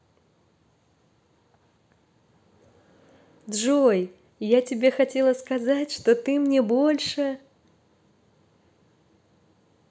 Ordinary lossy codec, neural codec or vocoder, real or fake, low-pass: none; none; real; none